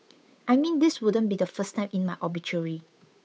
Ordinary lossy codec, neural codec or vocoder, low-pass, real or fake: none; codec, 16 kHz, 8 kbps, FunCodec, trained on Chinese and English, 25 frames a second; none; fake